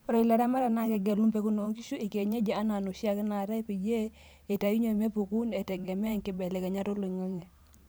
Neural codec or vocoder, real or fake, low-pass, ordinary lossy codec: vocoder, 44.1 kHz, 128 mel bands every 512 samples, BigVGAN v2; fake; none; none